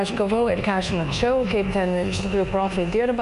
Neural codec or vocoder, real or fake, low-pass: codec, 24 kHz, 1.2 kbps, DualCodec; fake; 10.8 kHz